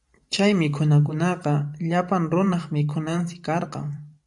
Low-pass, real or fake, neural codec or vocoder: 10.8 kHz; fake; vocoder, 44.1 kHz, 128 mel bands every 512 samples, BigVGAN v2